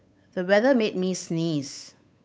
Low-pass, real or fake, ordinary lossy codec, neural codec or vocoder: none; fake; none; codec, 16 kHz, 8 kbps, FunCodec, trained on Chinese and English, 25 frames a second